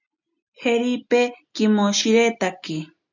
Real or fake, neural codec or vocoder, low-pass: real; none; 7.2 kHz